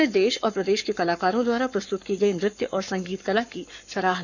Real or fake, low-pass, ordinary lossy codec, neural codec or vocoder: fake; 7.2 kHz; none; codec, 44.1 kHz, 7.8 kbps, Pupu-Codec